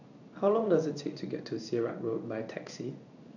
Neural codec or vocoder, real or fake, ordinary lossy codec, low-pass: codec, 16 kHz in and 24 kHz out, 1 kbps, XY-Tokenizer; fake; none; 7.2 kHz